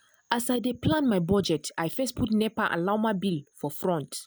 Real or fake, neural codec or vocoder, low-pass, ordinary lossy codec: real; none; none; none